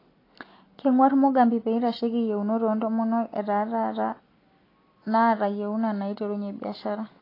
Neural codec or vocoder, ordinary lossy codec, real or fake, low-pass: none; AAC, 24 kbps; real; 5.4 kHz